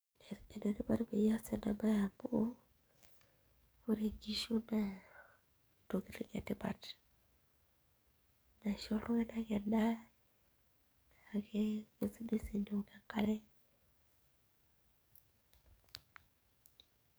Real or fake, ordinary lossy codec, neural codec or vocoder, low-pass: fake; none; codec, 44.1 kHz, 7.8 kbps, DAC; none